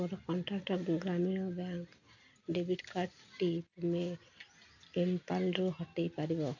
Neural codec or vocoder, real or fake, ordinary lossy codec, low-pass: none; real; none; 7.2 kHz